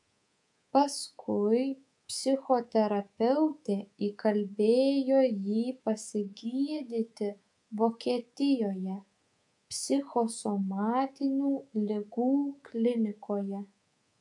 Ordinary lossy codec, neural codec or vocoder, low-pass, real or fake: AAC, 64 kbps; codec, 24 kHz, 3.1 kbps, DualCodec; 10.8 kHz; fake